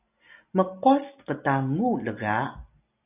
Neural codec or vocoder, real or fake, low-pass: none; real; 3.6 kHz